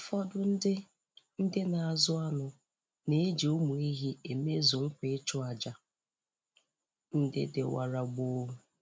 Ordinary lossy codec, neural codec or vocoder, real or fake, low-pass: none; none; real; none